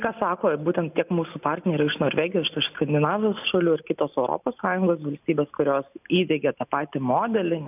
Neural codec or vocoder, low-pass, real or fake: none; 3.6 kHz; real